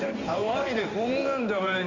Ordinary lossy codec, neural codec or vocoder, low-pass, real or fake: Opus, 64 kbps; codec, 16 kHz in and 24 kHz out, 1 kbps, XY-Tokenizer; 7.2 kHz; fake